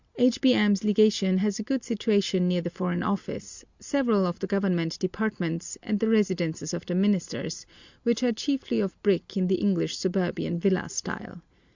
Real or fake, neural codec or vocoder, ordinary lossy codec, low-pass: real; none; Opus, 64 kbps; 7.2 kHz